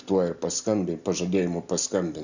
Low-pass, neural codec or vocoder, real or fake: 7.2 kHz; none; real